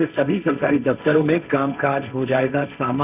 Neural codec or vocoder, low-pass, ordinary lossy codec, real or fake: codec, 16 kHz, 1.1 kbps, Voila-Tokenizer; 3.6 kHz; none; fake